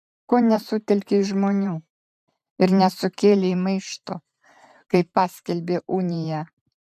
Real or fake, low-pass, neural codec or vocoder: fake; 14.4 kHz; vocoder, 44.1 kHz, 128 mel bands every 512 samples, BigVGAN v2